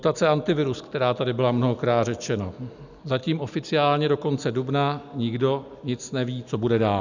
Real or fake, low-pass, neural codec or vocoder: real; 7.2 kHz; none